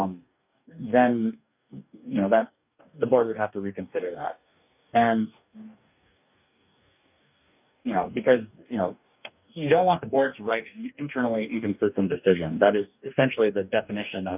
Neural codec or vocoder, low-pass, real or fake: codec, 44.1 kHz, 2.6 kbps, DAC; 3.6 kHz; fake